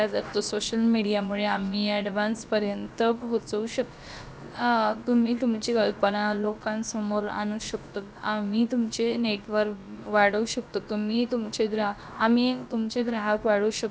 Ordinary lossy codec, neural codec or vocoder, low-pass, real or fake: none; codec, 16 kHz, about 1 kbps, DyCAST, with the encoder's durations; none; fake